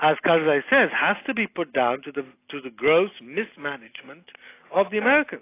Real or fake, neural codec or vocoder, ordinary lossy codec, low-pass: real; none; AAC, 24 kbps; 3.6 kHz